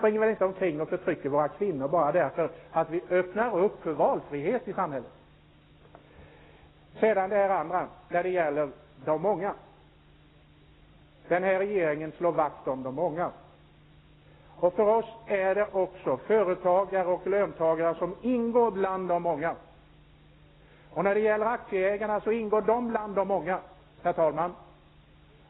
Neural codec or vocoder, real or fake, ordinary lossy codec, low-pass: none; real; AAC, 16 kbps; 7.2 kHz